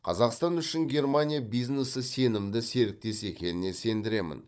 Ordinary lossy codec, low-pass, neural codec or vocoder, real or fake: none; none; codec, 16 kHz, 16 kbps, FunCodec, trained on Chinese and English, 50 frames a second; fake